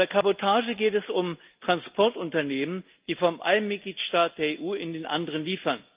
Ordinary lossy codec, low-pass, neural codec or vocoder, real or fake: Opus, 32 kbps; 3.6 kHz; none; real